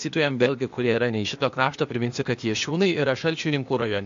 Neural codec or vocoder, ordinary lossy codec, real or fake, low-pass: codec, 16 kHz, 0.8 kbps, ZipCodec; MP3, 48 kbps; fake; 7.2 kHz